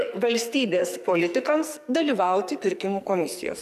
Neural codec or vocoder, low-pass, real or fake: codec, 44.1 kHz, 2.6 kbps, SNAC; 14.4 kHz; fake